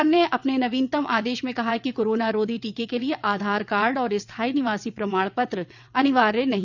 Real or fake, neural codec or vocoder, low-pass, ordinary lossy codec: fake; vocoder, 22.05 kHz, 80 mel bands, WaveNeXt; 7.2 kHz; none